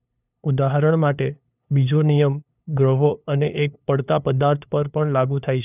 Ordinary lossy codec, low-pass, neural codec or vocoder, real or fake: none; 3.6 kHz; codec, 16 kHz, 2 kbps, FunCodec, trained on LibriTTS, 25 frames a second; fake